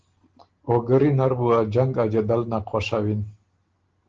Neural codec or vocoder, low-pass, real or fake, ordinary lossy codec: none; 7.2 kHz; real; Opus, 16 kbps